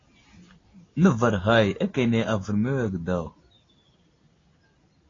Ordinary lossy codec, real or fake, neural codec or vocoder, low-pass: AAC, 32 kbps; real; none; 7.2 kHz